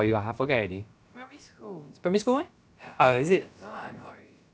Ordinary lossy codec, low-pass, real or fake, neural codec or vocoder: none; none; fake; codec, 16 kHz, about 1 kbps, DyCAST, with the encoder's durations